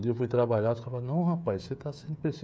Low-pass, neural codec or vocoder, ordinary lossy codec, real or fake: none; codec, 16 kHz, 16 kbps, FreqCodec, smaller model; none; fake